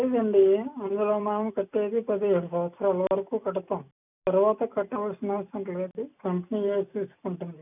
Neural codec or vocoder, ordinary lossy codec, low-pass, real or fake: none; none; 3.6 kHz; real